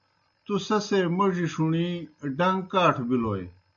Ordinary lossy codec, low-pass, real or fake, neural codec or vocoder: MP3, 64 kbps; 7.2 kHz; real; none